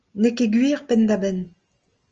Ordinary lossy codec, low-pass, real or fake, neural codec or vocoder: Opus, 24 kbps; 7.2 kHz; real; none